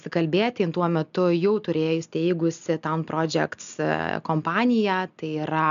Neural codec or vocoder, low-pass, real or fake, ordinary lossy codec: none; 7.2 kHz; real; MP3, 96 kbps